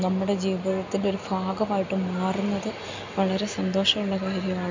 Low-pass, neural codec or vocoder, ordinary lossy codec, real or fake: 7.2 kHz; none; MP3, 64 kbps; real